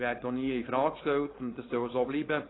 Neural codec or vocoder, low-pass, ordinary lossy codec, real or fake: codec, 16 kHz, 4.8 kbps, FACodec; 7.2 kHz; AAC, 16 kbps; fake